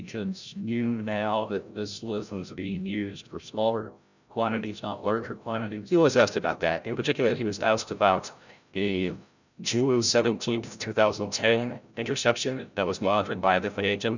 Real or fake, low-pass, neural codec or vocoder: fake; 7.2 kHz; codec, 16 kHz, 0.5 kbps, FreqCodec, larger model